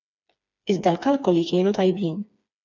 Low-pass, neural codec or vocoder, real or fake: 7.2 kHz; codec, 16 kHz, 4 kbps, FreqCodec, smaller model; fake